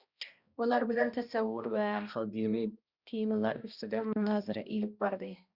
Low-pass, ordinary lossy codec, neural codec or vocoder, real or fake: 5.4 kHz; Opus, 64 kbps; codec, 16 kHz, 1 kbps, X-Codec, HuBERT features, trained on balanced general audio; fake